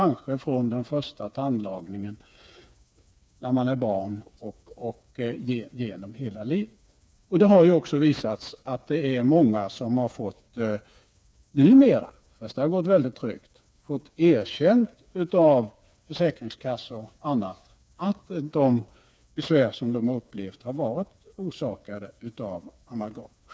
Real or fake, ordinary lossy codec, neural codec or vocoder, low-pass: fake; none; codec, 16 kHz, 4 kbps, FreqCodec, smaller model; none